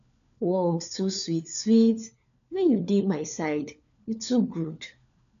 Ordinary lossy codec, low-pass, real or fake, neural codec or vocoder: none; 7.2 kHz; fake; codec, 16 kHz, 4 kbps, FunCodec, trained on LibriTTS, 50 frames a second